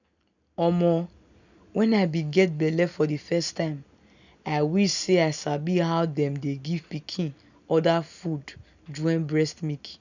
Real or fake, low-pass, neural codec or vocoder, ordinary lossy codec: real; 7.2 kHz; none; none